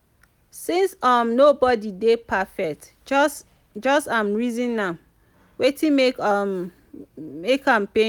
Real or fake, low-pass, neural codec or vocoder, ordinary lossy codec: real; 19.8 kHz; none; none